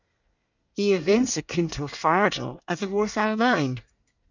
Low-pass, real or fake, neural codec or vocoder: 7.2 kHz; fake; codec, 24 kHz, 1 kbps, SNAC